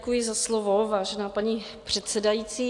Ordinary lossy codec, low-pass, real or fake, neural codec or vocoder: AAC, 64 kbps; 10.8 kHz; real; none